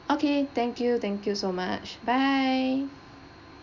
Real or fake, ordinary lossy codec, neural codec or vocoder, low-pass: real; none; none; 7.2 kHz